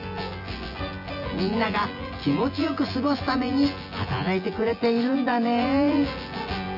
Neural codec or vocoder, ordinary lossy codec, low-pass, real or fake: vocoder, 24 kHz, 100 mel bands, Vocos; none; 5.4 kHz; fake